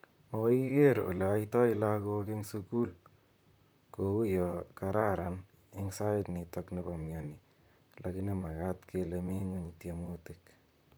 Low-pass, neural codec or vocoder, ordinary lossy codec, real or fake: none; vocoder, 44.1 kHz, 128 mel bands, Pupu-Vocoder; none; fake